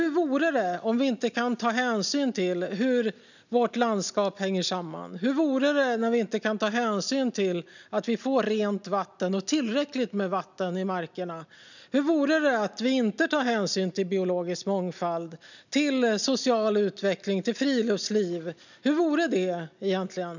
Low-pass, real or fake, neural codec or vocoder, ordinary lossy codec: 7.2 kHz; real; none; none